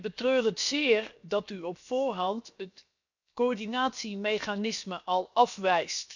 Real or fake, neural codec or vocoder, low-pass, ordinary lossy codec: fake; codec, 16 kHz, 0.7 kbps, FocalCodec; 7.2 kHz; none